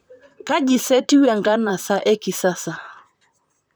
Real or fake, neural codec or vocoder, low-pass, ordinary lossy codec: fake; vocoder, 44.1 kHz, 128 mel bands, Pupu-Vocoder; none; none